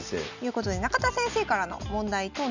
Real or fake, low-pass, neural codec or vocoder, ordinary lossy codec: real; 7.2 kHz; none; none